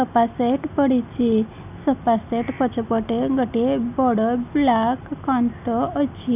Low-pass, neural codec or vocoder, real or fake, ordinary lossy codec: 3.6 kHz; none; real; none